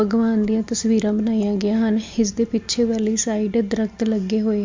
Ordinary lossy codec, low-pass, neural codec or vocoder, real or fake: MP3, 48 kbps; 7.2 kHz; none; real